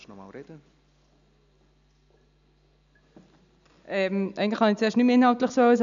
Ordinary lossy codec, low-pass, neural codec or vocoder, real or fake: none; 7.2 kHz; none; real